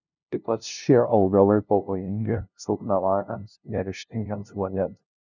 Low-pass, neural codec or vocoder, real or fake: 7.2 kHz; codec, 16 kHz, 0.5 kbps, FunCodec, trained on LibriTTS, 25 frames a second; fake